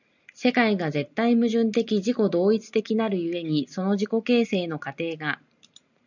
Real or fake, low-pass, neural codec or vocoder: real; 7.2 kHz; none